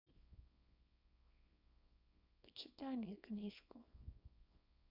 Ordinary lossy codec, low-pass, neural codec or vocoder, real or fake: none; 5.4 kHz; codec, 24 kHz, 0.9 kbps, WavTokenizer, small release; fake